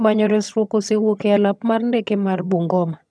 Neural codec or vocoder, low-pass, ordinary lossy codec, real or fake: vocoder, 22.05 kHz, 80 mel bands, HiFi-GAN; none; none; fake